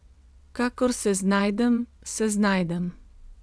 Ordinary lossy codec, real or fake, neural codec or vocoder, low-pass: none; fake; vocoder, 22.05 kHz, 80 mel bands, WaveNeXt; none